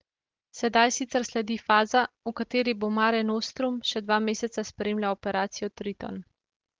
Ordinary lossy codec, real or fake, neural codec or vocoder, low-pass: Opus, 16 kbps; real; none; 7.2 kHz